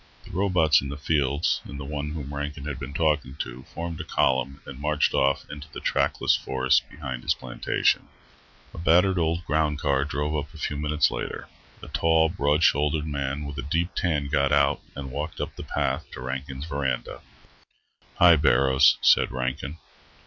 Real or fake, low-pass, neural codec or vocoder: real; 7.2 kHz; none